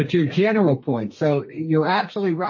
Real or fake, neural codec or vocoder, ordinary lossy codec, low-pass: fake; codec, 32 kHz, 1.9 kbps, SNAC; MP3, 32 kbps; 7.2 kHz